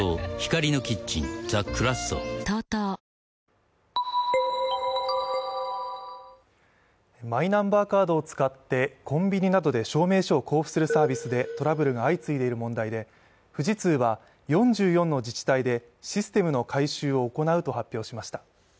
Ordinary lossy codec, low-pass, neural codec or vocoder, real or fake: none; none; none; real